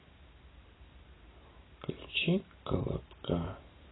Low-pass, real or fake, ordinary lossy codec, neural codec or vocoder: 7.2 kHz; real; AAC, 16 kbps; none